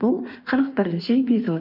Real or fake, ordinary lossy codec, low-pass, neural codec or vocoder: fake; none; 5.4 kHz; codec, 16 kHz in and 24 kHz out, 1.1 kbps, FireRedTTS-2 codec